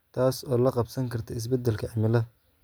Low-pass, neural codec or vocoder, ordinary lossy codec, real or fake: none; none; none; real